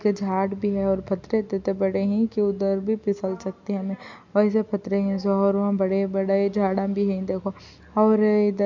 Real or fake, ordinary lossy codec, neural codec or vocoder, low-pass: real; MP3, 64 kbps; none; 7.2 kHz